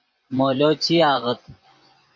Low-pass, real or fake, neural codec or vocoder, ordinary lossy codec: 7.2 kHz; fake; vocoder, 24 kHz, 100 mel bands, Vocos; MP3, 48 kbps